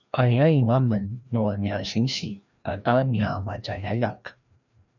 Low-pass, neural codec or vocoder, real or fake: 7.2 kHz; codec, 16 kHz, 1 kbps, FreqCodec, larger model; fake